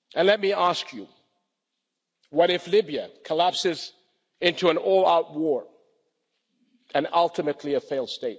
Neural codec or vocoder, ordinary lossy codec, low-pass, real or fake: none; none; none; real